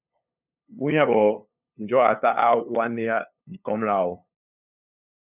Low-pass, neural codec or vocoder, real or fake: 3.6 kHz; codec, 16 kHz, 2 kbps, FunCodec, trained on LibriTTS, 25 frames a second; fake